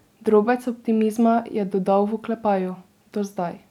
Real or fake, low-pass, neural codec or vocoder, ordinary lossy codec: real; 19.8 kHz; none; none